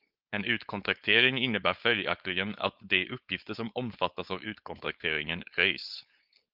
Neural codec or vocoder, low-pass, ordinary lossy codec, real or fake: codec, 16 kHz, 4.8 kbps, FACodec; 5.4 kHz; Opus, 32 kbps; fake